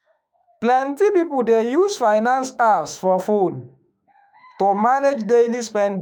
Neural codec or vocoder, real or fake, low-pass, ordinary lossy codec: autoencoder, 48 kHz, 32 numbers a frame, DAC-VAE, trained on Japanese speech; fake; 19.8 kHz; none